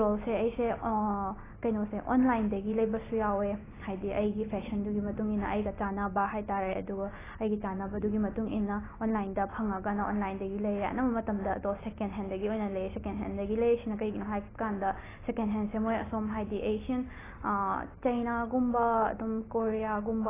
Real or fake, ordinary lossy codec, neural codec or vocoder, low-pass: real; AAC, 16 kbps; none; 3.6 kHz